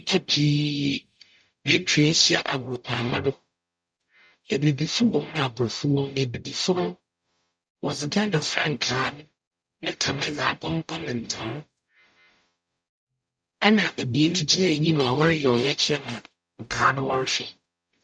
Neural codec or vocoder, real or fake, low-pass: codec, 44.1 kHz, 0.9 kbps, DAC; fake; 9.9 kHz